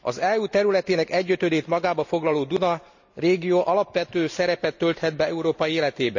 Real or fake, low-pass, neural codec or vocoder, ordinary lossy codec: real; 7.2 kHz; none; none